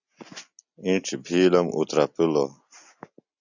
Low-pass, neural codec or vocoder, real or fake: 7.2 kHz; none; real